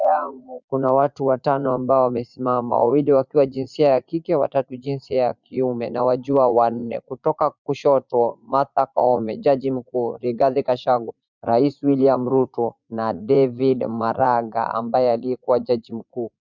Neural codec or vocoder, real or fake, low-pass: vocoder, 44.1 kHz, 80 mel bands, Vocos; fake; 7.2 kHz